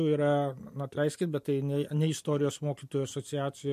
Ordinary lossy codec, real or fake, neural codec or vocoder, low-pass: MP3, 64 kbps; real; none; 14.4 kHz